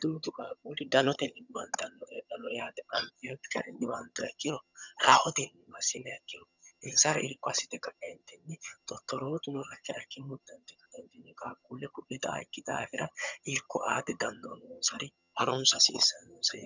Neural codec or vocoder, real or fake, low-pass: vocoder, 22.05 kHz, 80 mel bands, HiFi-GAN; fake; 7.2 kHz